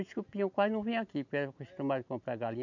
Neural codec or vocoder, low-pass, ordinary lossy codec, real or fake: none; 7.2 kHz; none; real